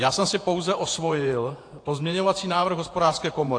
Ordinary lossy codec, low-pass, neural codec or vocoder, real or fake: AAC, 48 kbps; 9.9 kHz; vocoder, 48 kHz, 128 mel bands, Vocos; fake